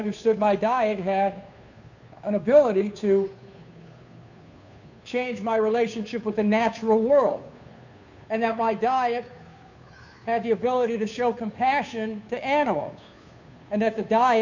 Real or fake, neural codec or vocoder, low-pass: fake; codec, 16 kHz, 2 kbps, FunCodec, trained on Chinese and English, 25 frames a second; 7.2 kHz